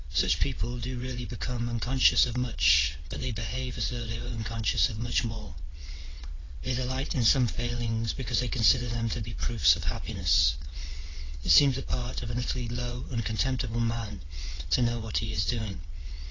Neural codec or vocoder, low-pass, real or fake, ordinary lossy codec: vocoder, 22.05 kHz, 80 mel bands, WaveNeXt; 7.2 kHz; fake; AAC, 32 kbps